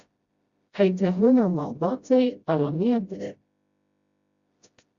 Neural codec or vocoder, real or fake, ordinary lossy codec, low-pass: codec, 16 kHz, 0.5 kbps, FreqCodec, smaller model; fake; Opus, 64 kbps; 7.2 kHz